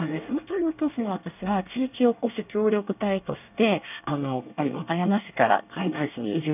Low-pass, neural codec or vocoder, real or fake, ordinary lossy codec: 3.6 kHz; codec, 24 kHz, 1 kbps, SNAC; fake; none